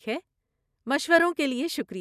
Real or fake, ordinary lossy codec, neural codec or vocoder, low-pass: real; none; none; 14.4 kHz